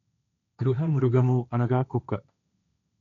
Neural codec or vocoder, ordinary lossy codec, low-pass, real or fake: codec, 16 kHz, 1.1 kbps, Voila-Tokenizer; none; 7.2 kHz; fake